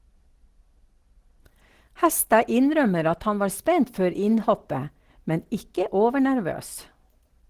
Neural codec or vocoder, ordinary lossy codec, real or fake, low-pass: none; Opus, 16 kbps; real; 14.4 kHz